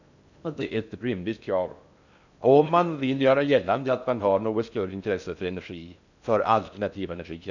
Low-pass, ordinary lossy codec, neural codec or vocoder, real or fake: 7.2 kHz; none; codec, 16 kHz in and 24 kHz out, 0.6 kbps, FocalCodec, streaming, 2048 codes; fake